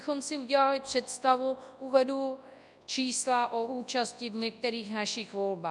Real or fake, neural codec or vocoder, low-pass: fake; codec, 24 kHz, 0.9 kbps, WavTokenizer, large speech release; 10.8 kHz